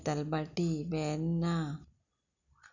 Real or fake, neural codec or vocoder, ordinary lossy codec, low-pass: real; none; none; 7.2 kHz